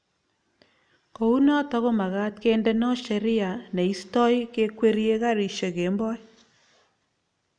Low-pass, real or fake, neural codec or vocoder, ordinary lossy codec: 9.9 kHz; real; none; none